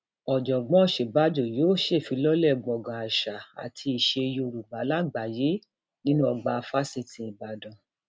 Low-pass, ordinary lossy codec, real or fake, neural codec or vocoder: none; none; real; none